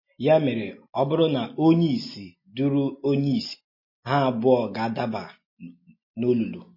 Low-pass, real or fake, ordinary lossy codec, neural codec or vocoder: 5.4 kHz; real; MP3, 24 kbps; none